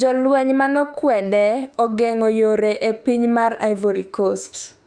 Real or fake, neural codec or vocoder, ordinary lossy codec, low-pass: fake; autoencoder, 48 kHz, 32 numbers a frame, DAC-VAE, trained on Japanese speech; Opus, 64 kbps; 9.9 kHz